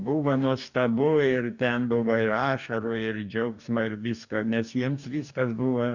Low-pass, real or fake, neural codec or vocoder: 7.2 kHz; fake; codec, 44.1 kHz, 2.6 kbps, DAC